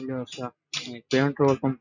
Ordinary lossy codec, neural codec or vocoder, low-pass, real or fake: none; none; 7.2 kHz; real